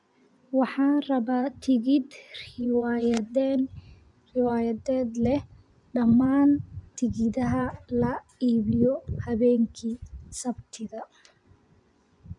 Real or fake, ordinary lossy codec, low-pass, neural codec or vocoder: fake; none; 10.8 kHz; vocoder, 24 kHz, 100 mel bands, Vocos